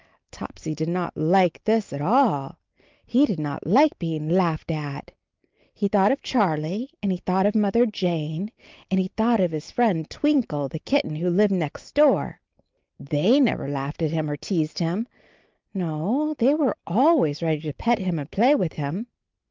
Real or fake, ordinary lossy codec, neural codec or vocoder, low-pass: real; Opus, 24 kbps; none; 7.2 kHz